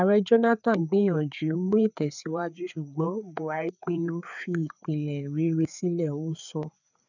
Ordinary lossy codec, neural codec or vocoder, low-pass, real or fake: none; codec, 16 kHz, 4 kbps, FreqCodec, larger model; 7.2 kHz; fake